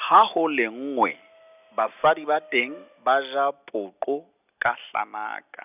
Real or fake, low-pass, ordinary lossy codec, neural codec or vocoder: real; 3.6 kHz; none; none